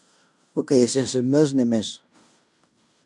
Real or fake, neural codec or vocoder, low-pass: fake; codec, 16 kHz in and 24 kHz out, 0.9 kbps, LongCat-Audio-Codec, fine tuned four codebook decoder; 10.8 kHz